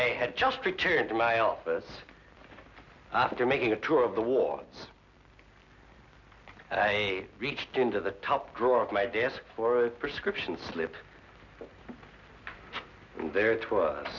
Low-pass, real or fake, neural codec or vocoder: 7.2 kHz; real; none